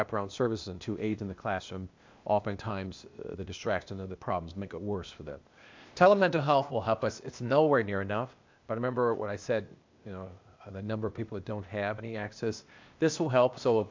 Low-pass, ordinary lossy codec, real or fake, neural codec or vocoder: 7.2 kHz; MP3, 64 kbps; fake; codec, 16 kHz, 0.8 kbps, ZipCodec